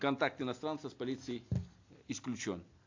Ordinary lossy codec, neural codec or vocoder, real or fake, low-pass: AAC, 48 kbps; none; real; 7.2 kHz